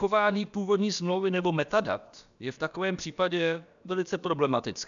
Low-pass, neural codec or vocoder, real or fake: 7.2 kHz; codec, 16 kHz, about 1 kbps, DyCAST, with the encoder's durations; fake